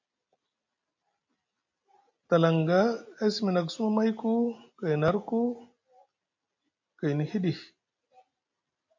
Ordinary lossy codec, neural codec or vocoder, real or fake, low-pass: MP3, 48 kbps; none; real; 7.2 kHz